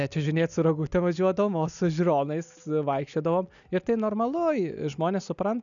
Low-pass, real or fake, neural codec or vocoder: 7.2 kHz; real; none